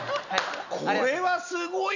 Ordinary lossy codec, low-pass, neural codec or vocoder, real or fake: none; 7.2 kHz; none; real